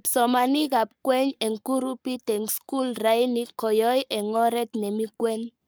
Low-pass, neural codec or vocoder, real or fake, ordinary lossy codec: none; codec, 44.1 kHz, 7.8 kbps, Pupu-Codec; fake; none